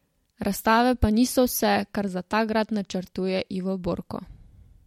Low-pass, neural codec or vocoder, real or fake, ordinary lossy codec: 19.8 kHz; none; real; MP3, 64 kbps